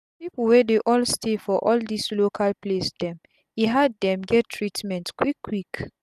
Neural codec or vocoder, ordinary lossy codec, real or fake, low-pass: none; none; real; 14.4 kHz